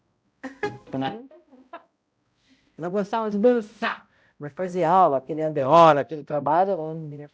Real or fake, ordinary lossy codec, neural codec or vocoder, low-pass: fake; none; codec, 16 kHz, 0.5 kbps, X-Codec, HuBERT features, trained on balanced general audio; none